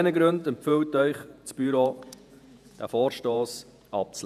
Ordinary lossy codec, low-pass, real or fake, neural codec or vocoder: none; 14.4 kHz; fake; vocoder, 48 kHz, 128 mel bands, Vocos